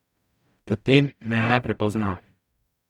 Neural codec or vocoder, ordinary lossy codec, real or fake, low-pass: codec, 44.1 kHz, 0.9 kbps, DAC; none; fake; 19.8 kHz